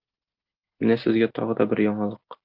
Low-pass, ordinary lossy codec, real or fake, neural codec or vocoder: 5.4 kHz; Opus, 32 kbps; real; none